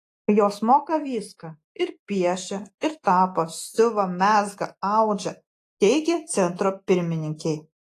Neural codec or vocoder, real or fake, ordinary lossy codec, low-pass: none; real; AAC, 48 kbps; 14.4 kHz